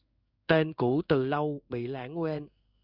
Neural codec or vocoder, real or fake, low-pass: codec, 16 kHz in and 24 kHz out, 1 kbps, XY-Tokenizer; fake; 5.4 kHz